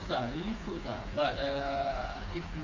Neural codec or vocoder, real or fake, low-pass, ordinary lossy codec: codec, 16 kHz, 4 kbps, FreqCodec, smaller model; fake; 7.2 kHz; MP3, 48 kbps